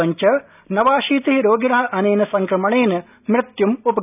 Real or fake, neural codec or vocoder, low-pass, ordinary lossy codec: real; none; 3.6 kHz; none